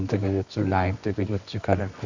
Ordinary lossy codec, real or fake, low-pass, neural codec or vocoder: Opus, 64 kbps; fake; 7.2 kHz; codec, 24 kHz, 0.9 kbps, WavTokenizer, medium music audio release